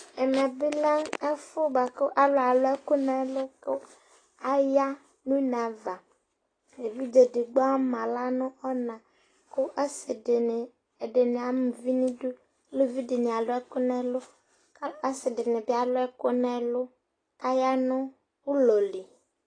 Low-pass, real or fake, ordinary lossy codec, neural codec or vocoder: 9.9 kHz; real; AAC, 32 kbps; none